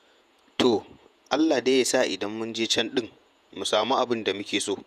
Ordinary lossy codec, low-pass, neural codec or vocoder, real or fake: none; 14.4 kHz; vocoder, 48 kHz, 128 mel bands, Vocos; fake